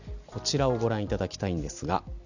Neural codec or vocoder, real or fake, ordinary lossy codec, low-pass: none; real; none; 7.2 kHz